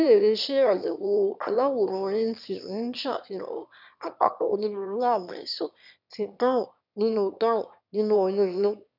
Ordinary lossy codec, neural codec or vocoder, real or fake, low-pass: none; autoencoder, 22.05 kHz, a latent of 192 numbers a frame, VITS, trained on one speaker; fake; 5.4 kHz